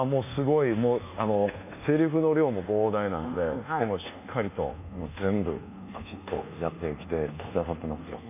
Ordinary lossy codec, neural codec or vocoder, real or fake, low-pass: none; codec, 24 kHz, 1.2 kbps, DualCodec; fake; 3.6 kHz